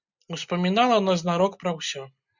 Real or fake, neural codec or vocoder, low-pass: real; none; 7.2 kHz